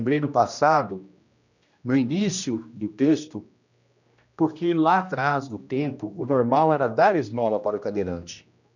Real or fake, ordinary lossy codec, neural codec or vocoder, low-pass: fake; none; codec, 16 kHz, 1 kbps, X-Codec, HuBERT features, trained on general audio; 7.2 kHz